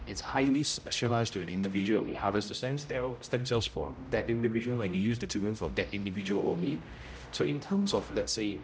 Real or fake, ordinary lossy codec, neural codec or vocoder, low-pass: fake; none; codec, 16 kHz, 0.5 kbps, X-Codec, HuBERT features, trained on general audio; none